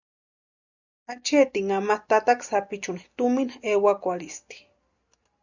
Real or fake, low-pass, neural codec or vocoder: real; 7.2 kHz; none